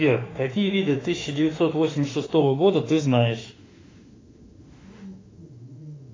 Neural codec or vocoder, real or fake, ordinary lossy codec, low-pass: autoencoder, 48 kHz, 32 numbers a frame, DAC-VAE, trained on Japanese speech; fake; AAC, 32 kbps; 7.2 kHz